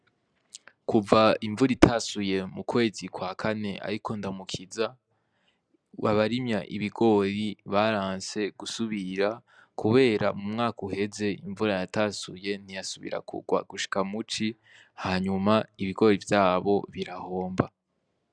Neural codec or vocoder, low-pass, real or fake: none; 9.9 kHz; real